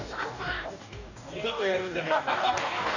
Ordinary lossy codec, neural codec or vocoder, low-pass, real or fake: none; codec, 44.1 kHz, 2.6 kbps, DAC; 7.2 kHz; fake